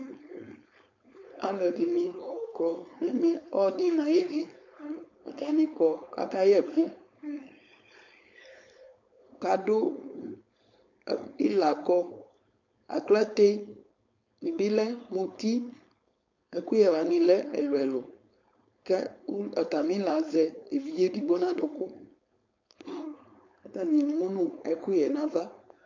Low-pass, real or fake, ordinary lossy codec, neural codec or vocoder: 7.2 kHz; fake; MP3, 48 kbps; codec, 16 kHz, 4.8 kbps, FACodec